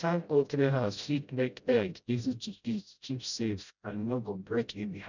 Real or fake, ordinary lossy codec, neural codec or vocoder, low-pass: fake; none; codec, 16 kHz, 0.5 kbps, FreqCodec, smaller model; 7.2 kHz